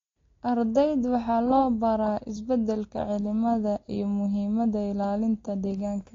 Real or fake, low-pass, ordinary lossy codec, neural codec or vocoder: real; 7.2 kHz; AAC, 32 kbps; none